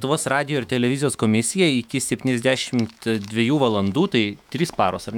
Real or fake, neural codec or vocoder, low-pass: fake; autoencoder, 48 kHz, 128 numbers a frame, DAC-VAE, trained on Japanese speech; 19.8 kHz